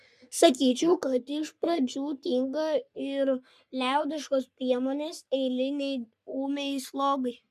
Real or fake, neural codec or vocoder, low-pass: fake; codec, 44.1 kHz, 3.4 kbps, Pupu-Codec; 14.4 kHz